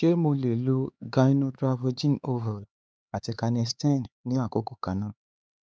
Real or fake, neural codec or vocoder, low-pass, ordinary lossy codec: fake; codec, 16 kHz, 4 kbps, X-Codec, HuBERT features, trained on LibriSpeech; none; none